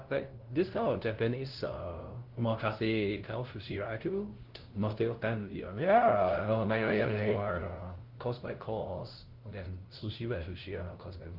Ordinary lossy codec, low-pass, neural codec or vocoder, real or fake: Opus, 16 kbps; 5.4 kHz; codec, 16 kHz, 0.5 kbps, FunCodec, trained on LibriTTS, 25 frames a second; fake